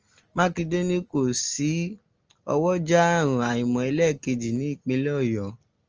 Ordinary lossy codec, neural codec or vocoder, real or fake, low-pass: Opus, 24 kbps; none; real; 7.2 kHz